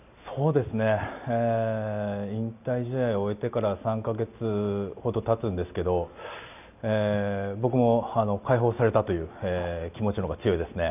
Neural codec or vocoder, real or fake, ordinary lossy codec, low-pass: none; real; none; 3.6 kHz